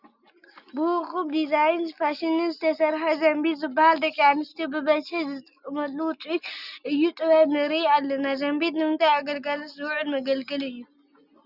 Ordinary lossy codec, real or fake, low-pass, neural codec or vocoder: Opus, 64 kbps; real; 5.4 kHz; none